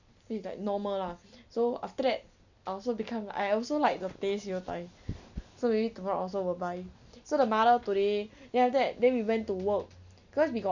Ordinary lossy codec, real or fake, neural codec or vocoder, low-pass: none; real; none; 7.2 kHz